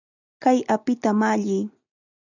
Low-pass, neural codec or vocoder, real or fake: 7.2 kHz; none; real